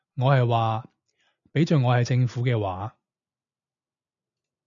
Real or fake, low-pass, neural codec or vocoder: real; 7.2 kHz; none